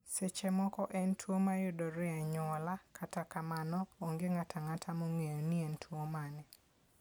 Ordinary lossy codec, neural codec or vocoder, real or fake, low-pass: none; none; real; none